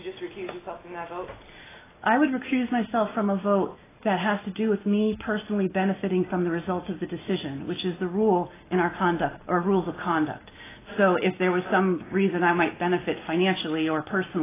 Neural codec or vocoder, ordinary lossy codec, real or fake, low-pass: none; AAC, 16 kbps; real; 3.6 kHz